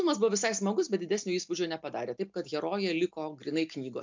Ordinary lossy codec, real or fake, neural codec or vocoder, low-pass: MP3, 64 kbps; real; none; 7.2 kHz